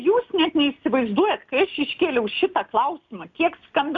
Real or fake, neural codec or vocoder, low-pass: real; none; 7.2 kHz